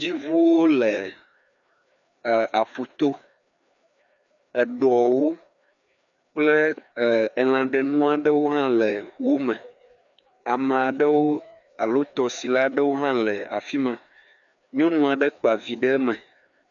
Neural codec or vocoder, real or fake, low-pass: codec, 16 kHz, 2 kbps, FreqCodec, larger model; fake; 7.2 kHz